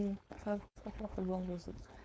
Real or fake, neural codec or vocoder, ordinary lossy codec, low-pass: fake; codec, 16 kHz, 4.8 kbps, FACodec; none; none